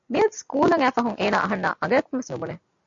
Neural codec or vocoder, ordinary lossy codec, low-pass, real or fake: none; MP3, 64 kbps; 7.2 kHz; real